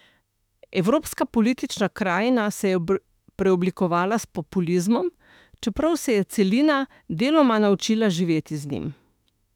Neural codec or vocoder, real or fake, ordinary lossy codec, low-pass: autoencoder, 48 kHz, 32 numbers a frame, DAC-VAE, trained on Japanese speech; fake; none; 19.8 kHz